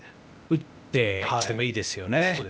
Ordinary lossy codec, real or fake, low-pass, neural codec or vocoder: none; fake; none; codec, 16 kHz, 0.8 kbps, ZipCodec